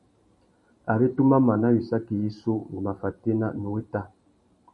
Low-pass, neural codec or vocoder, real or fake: 10.8 kHz; vocoder, 44.1 kHz, 128 mel bands every 512 samples, BigVGAN v2; fake